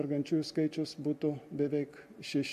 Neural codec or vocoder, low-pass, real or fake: none; 14.4 kHz; real